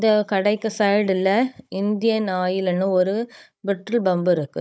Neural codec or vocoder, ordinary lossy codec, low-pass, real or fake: codec, 16 kHz, 16 kbps, FunCodec, trained on Chinese and English, 50 frames a second; none; none; fake